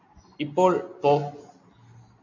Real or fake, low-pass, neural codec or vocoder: real; 7.2 kHz; none